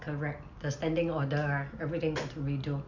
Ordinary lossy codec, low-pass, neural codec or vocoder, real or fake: MP3, 64 kbps; 7.2 kHz; none; real